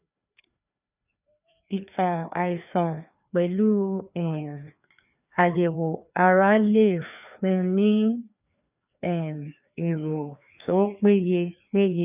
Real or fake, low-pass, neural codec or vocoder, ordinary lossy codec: fake; 3.6 kHz; codec, 16 kHz, 2 kbps, FreqCodec, larger model; none